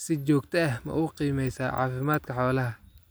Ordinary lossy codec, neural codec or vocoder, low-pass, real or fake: none; none; none; real